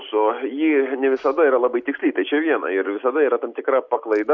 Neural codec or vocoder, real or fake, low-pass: none; real; 7.2 kHz